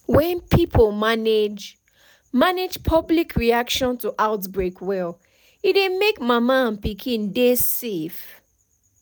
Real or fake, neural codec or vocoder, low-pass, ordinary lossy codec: real; none; none; none